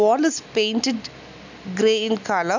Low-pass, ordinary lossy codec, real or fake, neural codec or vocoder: 7.2 kHz; MP3, 64 kbps; real; none